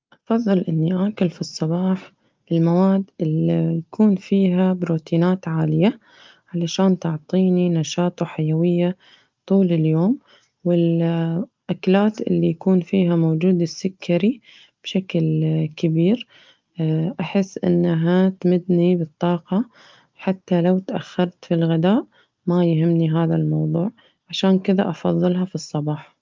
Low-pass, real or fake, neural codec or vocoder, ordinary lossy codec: 7.2 kHz; real; none; Opus, 24 kbps